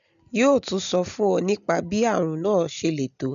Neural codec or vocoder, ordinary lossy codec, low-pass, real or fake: none; none; 7.2 kHz; real